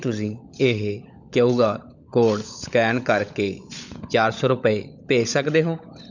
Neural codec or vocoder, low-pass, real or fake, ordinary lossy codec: codec, 16 kHz, 16 kbps, FunCodec, trained on LibriTTS, 50 frames a second; 7.2 kHz; fake; none